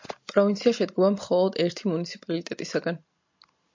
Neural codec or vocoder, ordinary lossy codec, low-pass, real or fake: none; MP3, 48 kbps; 7.2 kHz; real